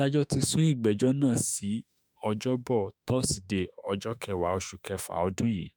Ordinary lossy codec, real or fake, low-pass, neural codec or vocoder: none; fake; none; autoencoder, 48 kHz, 32 numbers a frame, DAC-VAE, trained on Japanese speech